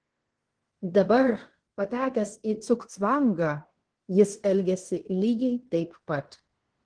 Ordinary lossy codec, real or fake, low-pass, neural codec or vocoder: Opus, 16 kbps; fake; 9.9 kHz; codec, 16 kHz in and 24 kHz out, 0.9 kbps, LongCat-Audio-Codec, fine tuned four codebook decoder